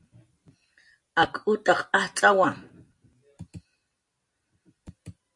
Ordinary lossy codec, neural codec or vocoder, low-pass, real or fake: MP3, 48 kbps; none; 10.8 kHz; real